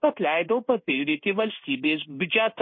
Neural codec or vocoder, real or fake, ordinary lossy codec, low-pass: codec, 16 kHz in and 24 kHz out, 1 kbps, XY-Tokenizer; fake; MP3, 24 kbps; 7.2 kHz